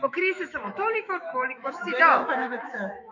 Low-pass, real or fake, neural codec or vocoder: 7.2 kHz; fake; autoencoder, 48 kHz, 128 numbers a frame, DAC-VAE, trained on Japanese speech